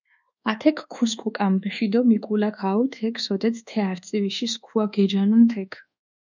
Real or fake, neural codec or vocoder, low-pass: fake; codec, 24 kHz, 1.2 kbps, DualCodec; 7.2 kHz